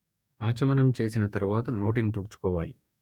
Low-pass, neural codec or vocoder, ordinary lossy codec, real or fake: 19.8 kHz; codec, 44.1 kHz, 2.6 kbps, DAC; none; fake